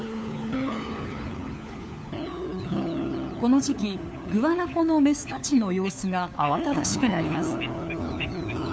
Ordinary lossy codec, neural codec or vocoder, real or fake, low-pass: none; codec, 16 kHz, 4 kbps, FunCodec, trained on LibriTTS, 50 frames a second; fake; none